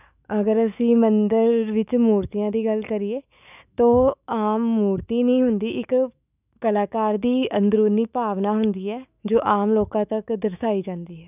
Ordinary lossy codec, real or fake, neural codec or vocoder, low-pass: none; fake; autoencoder, 48 kHz, 128 numbers a frame, DAC-VAE, trained on Japanese speech; 3.6 kHz